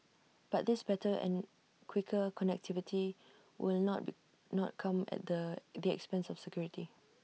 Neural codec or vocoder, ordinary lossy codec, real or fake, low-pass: none; none; real; none